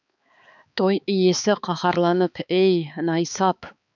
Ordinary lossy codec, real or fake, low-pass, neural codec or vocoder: none; fake; 7.2 kHz; codec, 16 kHz, 4 kbps, X-Codec, HuBERT features, trained on balanced general audio